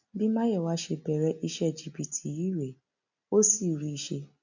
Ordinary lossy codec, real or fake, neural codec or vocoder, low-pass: none; real; none; 7.2 kHz